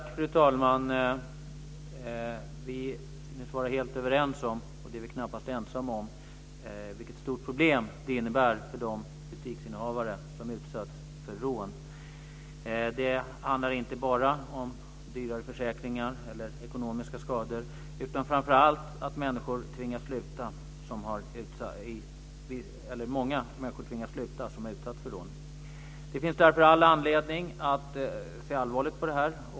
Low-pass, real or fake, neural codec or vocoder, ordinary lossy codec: none; real; none; none